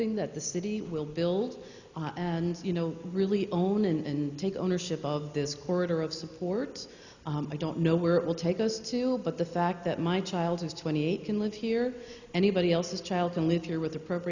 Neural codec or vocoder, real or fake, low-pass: none; real; 7.2 kHz